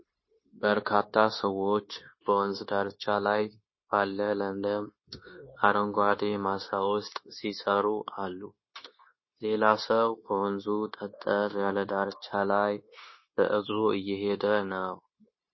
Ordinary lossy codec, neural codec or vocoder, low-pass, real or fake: MP3, 24 kbps; codec, 16 kHz, 0.9 kbps, LongCat-Audio-Codec; 7.2 kHz; fake